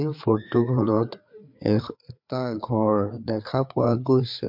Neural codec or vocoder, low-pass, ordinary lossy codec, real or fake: codec, 16 kHz, 4 kbps, FreqCodec, larger model; 5.4 kHz; MP3, 48 kbps; fake